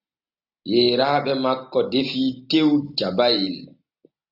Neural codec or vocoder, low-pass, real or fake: none; 5.4 kHz; real